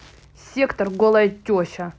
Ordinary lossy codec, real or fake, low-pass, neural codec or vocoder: none; real; none; none